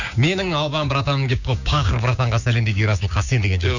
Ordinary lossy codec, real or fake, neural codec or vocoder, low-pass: none; fake; codec, 16 kHz, 6 kbps, DAC; 7.2 kHz